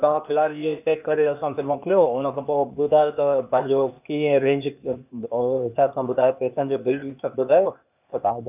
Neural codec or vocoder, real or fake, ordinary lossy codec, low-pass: codec, 16 kHz, 0.8 kbps, ZipCodec; fake; none; 3.6 kHz